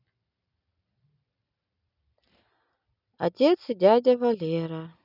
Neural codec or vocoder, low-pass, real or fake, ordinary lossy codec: none; 5.4 kHz; real; none